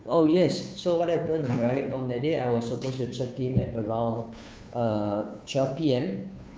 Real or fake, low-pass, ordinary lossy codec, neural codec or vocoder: fake; none; none; codec, 16 kHz, 2 kbps, FunCodec, trained on Chinese and English, 25 frames a second